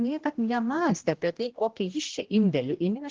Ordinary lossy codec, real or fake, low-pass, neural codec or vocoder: Opus, 16 kbps; fake; 7.2 kHz; codec, 16 kHz, 1 kbps, X-Codec, HuBERT features, trained on general audio